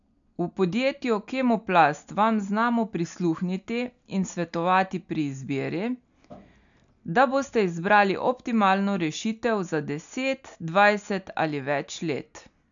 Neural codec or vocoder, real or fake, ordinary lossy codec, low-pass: none; real; MP3, 96 kbps; 7.2 kHz